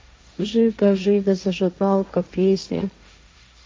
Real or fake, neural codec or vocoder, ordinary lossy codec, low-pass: fake; codec, 16 kHz, 1.1 kbps, Voila-Tokenizer; none; none